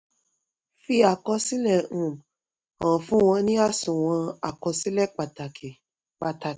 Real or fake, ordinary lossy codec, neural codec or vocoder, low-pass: real; none; none; none